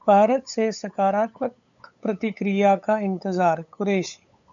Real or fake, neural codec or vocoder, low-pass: fake; codec, 16 kHz, 8 kbps, FunCodec, trained on LibriTTS, 25 frames a second; 7.2 kHz